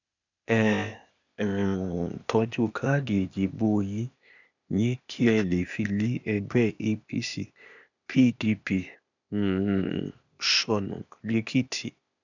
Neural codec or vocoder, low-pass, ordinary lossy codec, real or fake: codec, 16 kHz, 0.8 kbps, ZipCodec; 7.2 kHz; none; fake